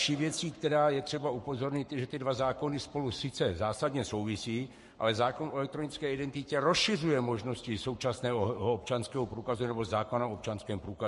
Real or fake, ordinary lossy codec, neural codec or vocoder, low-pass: fake; MP3, 48 kbps; codec, 44.1 kHz, 7.8 kbps, Pupu-Codec; 14.4 kHz